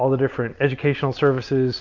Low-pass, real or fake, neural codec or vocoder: 7.2 kHz; real; none